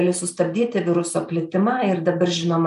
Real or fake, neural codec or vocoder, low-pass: real; none; 10.8 kHz